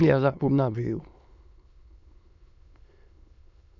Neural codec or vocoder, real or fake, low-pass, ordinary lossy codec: autoencoder, 22.05 kHz, a latent of 192 numbers a frame, VITS, trained on many speakers; fake; 7.2 kHz; none